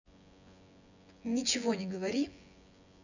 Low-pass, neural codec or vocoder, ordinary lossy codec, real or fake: 7.2 kHz; vocoder, 24 kHz, 100 mel bands, Vocos; none; fake